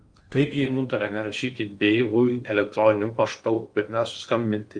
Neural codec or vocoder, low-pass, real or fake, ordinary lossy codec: codec, 16 kHz in and 24 kHz out, 0.8 kbps, FocalCodec, streaming, 65536 codes; 9.9 kHz; fake; Opus, 32 kbps